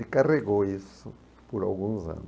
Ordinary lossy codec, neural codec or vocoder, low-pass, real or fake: none; none; none; real